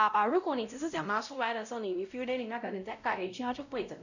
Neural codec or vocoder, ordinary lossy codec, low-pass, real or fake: codec, 16 kHz, 0.5 kbps, X-Codec, WavLM features, trained on Multilingual LibriSpeech; none; 7.2 kHz; fake